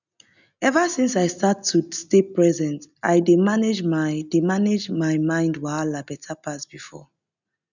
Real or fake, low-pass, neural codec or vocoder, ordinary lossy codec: real; 7.2 kHz; none; none